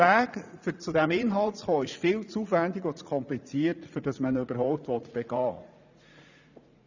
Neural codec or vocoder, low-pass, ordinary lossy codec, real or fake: vocoder, 44.1 kHz, 80 mel bands, Vocos; 7.2 kHz; none; fake